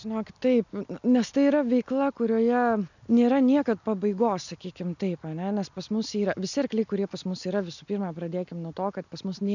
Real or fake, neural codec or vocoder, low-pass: real; none; 7.2 kHz